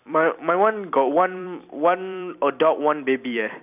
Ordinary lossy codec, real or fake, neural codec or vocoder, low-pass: none; fake; vocoder, 44.1 kHz, 128 mel bands every 256 samples, BigVGAN v2; 3.6 kHz